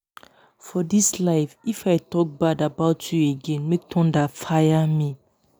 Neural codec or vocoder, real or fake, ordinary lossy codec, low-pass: none; real; none; none